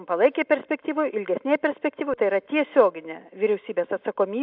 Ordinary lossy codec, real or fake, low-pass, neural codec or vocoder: AAC, 48 kbps; real; 5.4 kHz; none